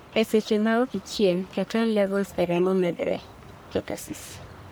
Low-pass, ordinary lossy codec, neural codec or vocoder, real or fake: none; none; codec, 44.1 kHz, 1.7 kbps, Pupu-Codec; fake